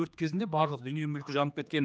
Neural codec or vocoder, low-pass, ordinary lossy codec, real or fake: codec, 16 kHz, 2 kbps, X-Codec, HuBERT features, trained on general audio; none; none; fake